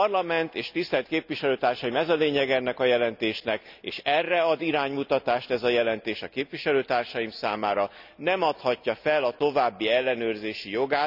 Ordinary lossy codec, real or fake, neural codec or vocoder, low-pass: none; real; none; 5.4 kHz